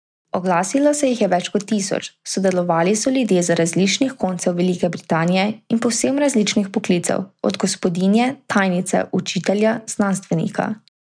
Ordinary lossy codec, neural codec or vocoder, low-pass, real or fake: none; none; 9.9 kHz; real